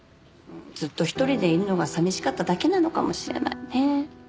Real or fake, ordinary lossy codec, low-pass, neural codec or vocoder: real; none; none; none